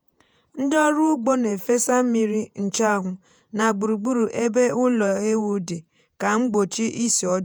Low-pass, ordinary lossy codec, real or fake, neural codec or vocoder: none; none; fake; vocoder, 48 kHz, 128 mel bands, Vocos